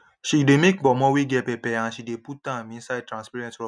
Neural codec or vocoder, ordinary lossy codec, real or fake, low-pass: none; none; real; 9.9 kHz